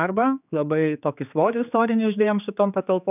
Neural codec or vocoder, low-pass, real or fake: codec, 16 kHz, 4 kbps, X-Codec, HuBERT features, trained on general audio; 3.6 kHz; fake